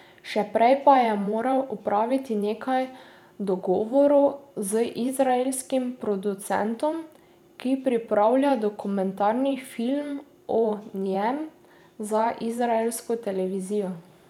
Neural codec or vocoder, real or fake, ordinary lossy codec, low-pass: vocoder, 44.1 kHz, 128 mel bands every 512 samples, BigVGAN v2; fake; none; 19.8 kHz